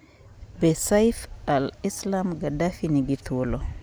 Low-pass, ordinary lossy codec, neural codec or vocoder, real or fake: none; none; none; real